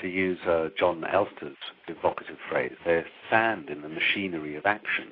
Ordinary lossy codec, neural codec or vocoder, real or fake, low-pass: AAC, 24 kbps; none; real; 5.4 kHz